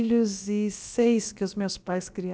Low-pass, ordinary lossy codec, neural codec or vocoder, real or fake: none; none; codec, 16 kHz, about 1 kbps, DyCAST, with the encoder's durations; fake